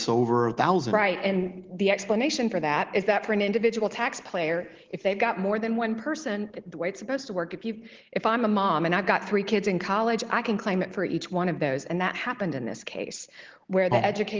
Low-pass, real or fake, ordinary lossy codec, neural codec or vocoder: 7.2 kHz; real; Opus, 16 kbps; none